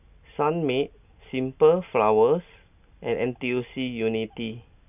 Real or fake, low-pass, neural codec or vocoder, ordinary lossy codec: real; 3.6 kHz; none; none